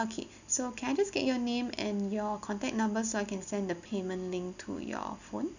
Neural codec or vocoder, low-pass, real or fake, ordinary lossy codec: none; 7.2 kHz; real; none